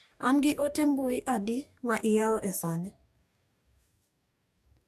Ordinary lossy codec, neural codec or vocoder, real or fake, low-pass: none; codec, 44.1 kHz, 2.6 kbps, DAC; fake; 14.4 kHz